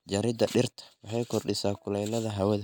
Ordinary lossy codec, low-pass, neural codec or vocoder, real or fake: none; none; none; real